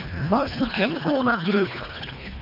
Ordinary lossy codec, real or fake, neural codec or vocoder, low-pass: none; fake; codec, 24 kHz, 1.5 kbps, HILCodec; 5.4 kHz